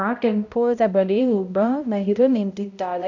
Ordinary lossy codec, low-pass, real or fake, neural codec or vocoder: none; 7.2 kHz; fake; codec, 16 kHz, 0.5 kbps, X-Codec, HuBERT features, trained on balanced general audio